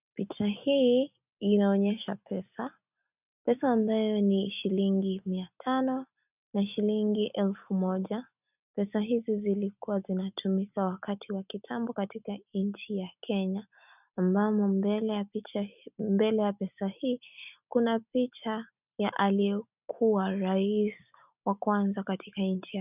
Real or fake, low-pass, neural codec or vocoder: real; 3.6 kHz; none